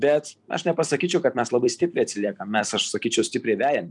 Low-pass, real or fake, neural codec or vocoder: 10.8 kHz; fake; vocoder, 44.1 kHz, 128 mel bands every 256 samples, BigVGAN v2